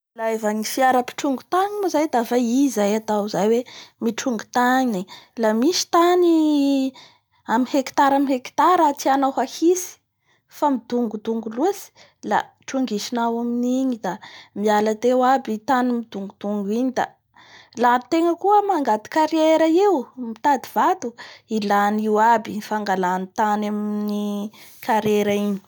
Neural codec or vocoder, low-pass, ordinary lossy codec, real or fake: none; none; none; real